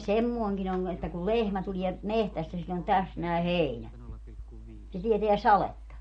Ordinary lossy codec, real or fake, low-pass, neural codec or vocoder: MP3, 48 kbps; real; 9.9 kHz; none